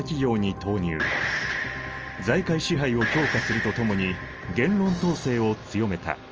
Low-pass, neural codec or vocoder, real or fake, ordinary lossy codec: 7.2 kHz; none; real; Opus, 24 kbps